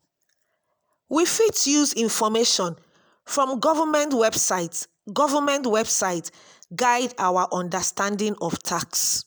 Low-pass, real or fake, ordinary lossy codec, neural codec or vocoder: none; real; none; none